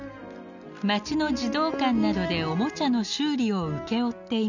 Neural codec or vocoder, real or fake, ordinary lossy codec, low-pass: none; real; none; 7.2 kHz